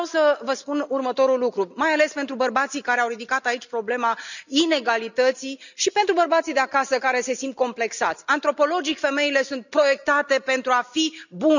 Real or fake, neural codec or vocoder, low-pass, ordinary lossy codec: real; none; 7.2 kHz; none